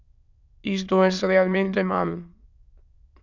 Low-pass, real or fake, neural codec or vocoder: 7.2 kHz; fake; autoencoder, 22.05 kHz, a latent of 192 numbers a frame, VITS, trained on many speakers